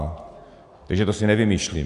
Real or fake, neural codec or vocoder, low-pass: real; none; 10.8 kHz